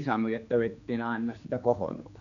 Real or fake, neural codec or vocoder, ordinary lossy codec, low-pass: fake; codec, 16 kHz, 2 kbps, X-Codec, HuBERT features, trained on general audio; none; 7.2 kHz